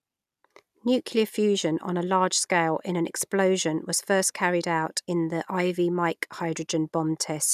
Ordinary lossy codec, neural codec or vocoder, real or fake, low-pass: none; none; real; 14.4 kHz